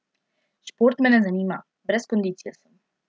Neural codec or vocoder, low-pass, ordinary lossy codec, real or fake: none; none; none; real